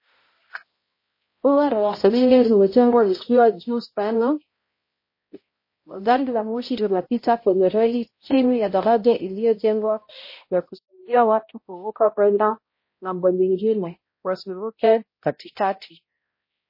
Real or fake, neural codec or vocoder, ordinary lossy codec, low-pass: fake; codec, 16 kHz, 0.5 kbps, X-Codec, HuBERT features, trained on balanced general audio; MP3, 24 kbps; 5.4 kHz